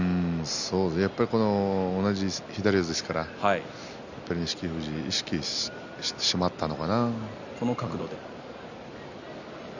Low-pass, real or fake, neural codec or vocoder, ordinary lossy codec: 7.2 kHz; real; none; none